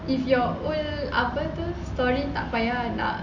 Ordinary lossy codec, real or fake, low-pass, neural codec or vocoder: MP3, 64 kbps; real; 7.2 kHz; none